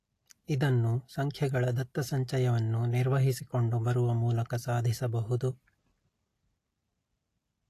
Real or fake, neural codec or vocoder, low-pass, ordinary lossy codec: real; none; 14.4 kHz; AAC, 48 kbps